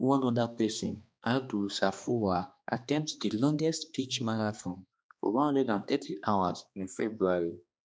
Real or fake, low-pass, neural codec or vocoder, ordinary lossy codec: fake; none; codec, 16 kHz, 2 kbps, X-Codec, HuBERT features, trained on balanced general audio; none